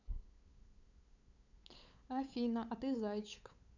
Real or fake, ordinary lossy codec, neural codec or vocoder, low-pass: fake; none; codec, 16 kHz, 8 kbps, FunCodec, trained on LibriTTS, 25 frames a second; 7.2 kHz